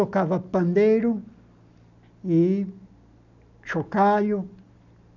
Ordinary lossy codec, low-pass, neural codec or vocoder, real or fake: none; 7.2 kHz; none; real